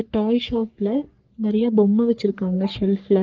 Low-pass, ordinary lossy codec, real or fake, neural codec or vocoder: 7.2 kHz; Opus, 32 kbps; fake; codec, 44.1 kHz, 3.4 kbps, Pupu-Codec